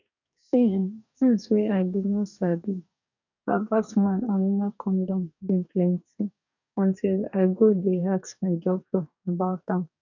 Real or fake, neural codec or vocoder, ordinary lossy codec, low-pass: fake; codec, 44.1 kHz, 2.6 kbps, DAC; none; 7.2 kHz